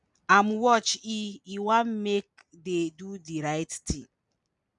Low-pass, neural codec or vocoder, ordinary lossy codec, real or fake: 10.8 kHz; none; none; real